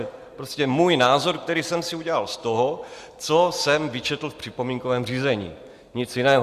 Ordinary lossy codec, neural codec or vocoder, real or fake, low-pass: Opus, 64 kbps; none; real; 14.4 kHz